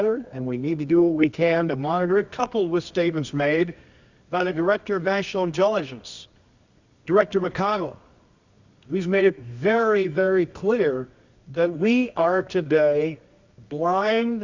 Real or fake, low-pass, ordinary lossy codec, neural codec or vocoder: fake; 7.2 kHz; Opus, 64 kbps; codec, 24 kHz, 0.9 kbps, WavTokenizer, medium music audio release